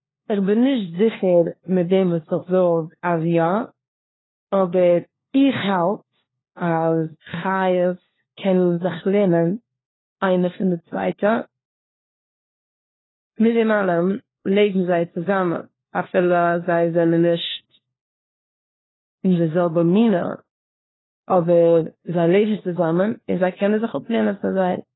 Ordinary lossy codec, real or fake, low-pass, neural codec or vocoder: AAC, 16 kbps; fake; 7.2 kHz; codec, 16 kHz, 1 kbps, FunCodec, trained on LibriTTS, 50 frames a second